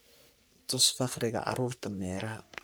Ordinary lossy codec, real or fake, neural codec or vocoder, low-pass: none; fake; codec, 44.1 kHz, 3.4 kbps, Pupu-Codec; none